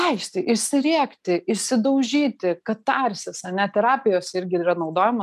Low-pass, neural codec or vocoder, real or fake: 14.4 kHz; none; real